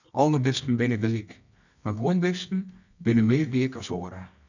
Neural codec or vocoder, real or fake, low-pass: codec, 24 kHz, 0.9 kbps, WavTokenizer, medium music audio release; fake; 7.2 kHz